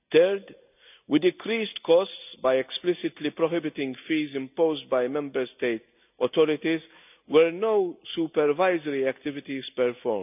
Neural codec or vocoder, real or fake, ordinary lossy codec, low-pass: none; real; none; 3.6 kHz